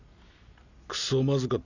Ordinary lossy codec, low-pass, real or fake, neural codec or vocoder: none; 7.2 kHz; real; none